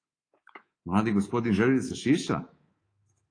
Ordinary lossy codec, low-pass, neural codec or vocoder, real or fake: AAC, 32 kbps; 9.9 kHz; codec, 24 kHz, 3.1 kbps, DualCodec; fake